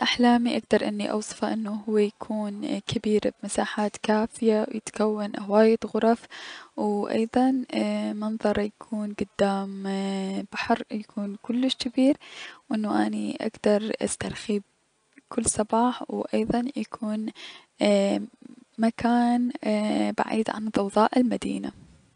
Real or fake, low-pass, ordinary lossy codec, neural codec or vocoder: real; 9.9 kHz; none; none